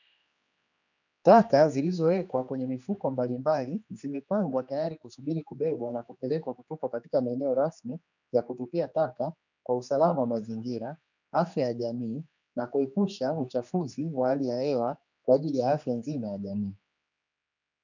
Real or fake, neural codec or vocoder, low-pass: fake; codec, 16 kHz, 2 kbps, X-Codec, HuBERT features, trained on general audio; 7.2 kHz